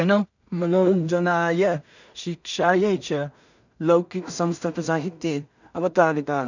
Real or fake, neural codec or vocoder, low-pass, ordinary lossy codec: fake; codec, 16 kHz in and 24 kHz out, 0.4 kbps, LongCat-Audio-Codec, two codebook decoder; 7.2 kHz; none